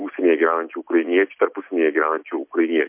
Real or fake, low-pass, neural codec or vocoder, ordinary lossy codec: real; 3.6 kHz; none; MP3, 32 kbps